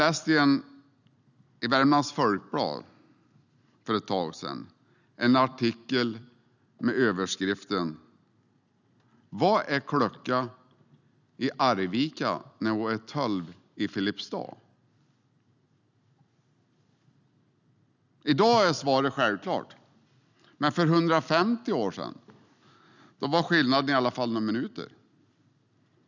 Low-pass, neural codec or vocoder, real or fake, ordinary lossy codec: 7.2 kHz; none; real; none